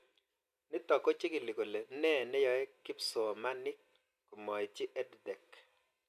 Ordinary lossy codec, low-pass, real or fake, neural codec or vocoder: none; 14.4 kHz; real; none